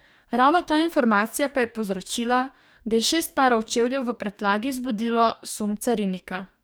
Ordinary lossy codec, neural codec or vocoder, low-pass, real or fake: none; codec, 44.1 kHz, 2.6 kbps, DAC; none; fake